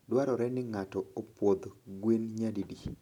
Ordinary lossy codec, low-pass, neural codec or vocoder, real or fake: none; 19.8 kHz; none; real